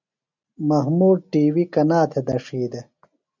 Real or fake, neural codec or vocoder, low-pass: real; none; 7.2 kHz